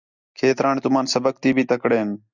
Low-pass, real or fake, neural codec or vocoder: 7.2 kHz; real; none